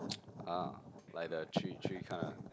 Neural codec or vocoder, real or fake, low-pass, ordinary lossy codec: none; real; none; none